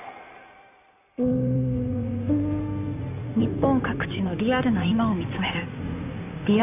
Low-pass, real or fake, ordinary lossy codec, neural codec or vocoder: 3.6 kHz; fake; none; codec, 16 kHz in and 24 kHz out, 2.2 kbps, FireRedTTS-2 codec